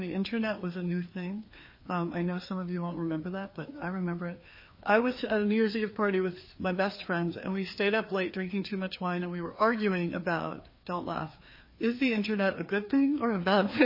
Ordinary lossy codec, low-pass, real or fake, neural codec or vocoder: MP3, 24 kbps; 5.4 kHz; fake; codec, 16 kHz, 2 kbps, FreqCodec, larger model